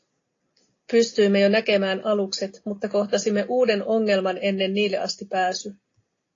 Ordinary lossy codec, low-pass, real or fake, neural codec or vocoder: AAC, 32 kbps; 7.2 kHz; real; none